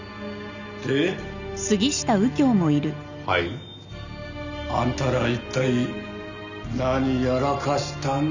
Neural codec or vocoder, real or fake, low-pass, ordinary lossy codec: none; real; 7.2 kHz; none